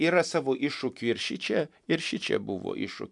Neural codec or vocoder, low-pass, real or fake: vocoder, 44.1 kHz, 128 mel bands every 256 samples, BigVGAN v2; 10.8 kHz; fake